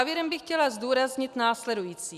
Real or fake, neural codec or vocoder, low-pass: real; none; 14.4 kHz